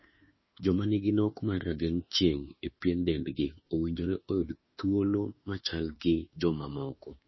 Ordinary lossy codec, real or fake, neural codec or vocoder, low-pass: MP3, 24 kbps; fake; autoencoder, 48 kHz, 32 numbers a frame, DAC-VAE, trained on Japanese speech; 7.2 kHz